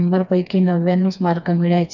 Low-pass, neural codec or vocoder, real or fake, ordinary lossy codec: 7.2 kHz; codec, 16 kHz, 2 kbps, FreqCodec, smaller model; fake; none